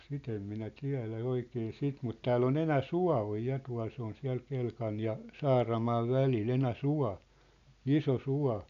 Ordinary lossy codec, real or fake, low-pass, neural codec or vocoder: none; real; 7.2 kHz; none